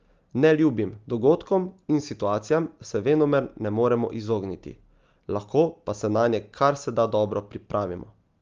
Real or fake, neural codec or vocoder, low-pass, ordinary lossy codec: real; none; 7.2 kHz; Opus, 32 kbps